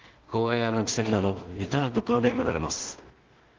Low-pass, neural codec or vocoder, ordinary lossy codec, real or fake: 7.2 kHz; codec, 16 kHz in and 24 kHz out, 0.4 kbps, LongCat-Audio-Codec, two codebook decoder; Opus, 32 kbps; fake